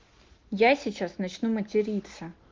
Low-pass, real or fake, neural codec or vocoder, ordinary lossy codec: 7.2 kHz; real; none; Opus, 32 kbps